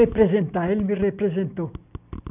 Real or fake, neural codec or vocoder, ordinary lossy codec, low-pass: real; none; none; 3.6 kHz